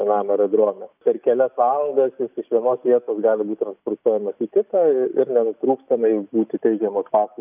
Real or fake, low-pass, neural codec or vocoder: real; 3.6 kHz; none